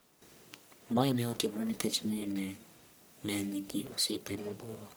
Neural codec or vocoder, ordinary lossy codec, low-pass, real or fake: codec, 44.1 kHz, 1.7 kbps, Pupu-Codec; none; none; fake